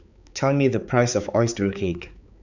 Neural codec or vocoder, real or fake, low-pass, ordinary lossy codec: codec, 16 kHz, 4 kbps, X-Codec, HuBERT features, trained on balanced general audio; fake; 7.2 kHz; none